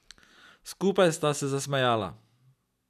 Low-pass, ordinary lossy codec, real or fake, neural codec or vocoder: 14.4 kHz; none; real; none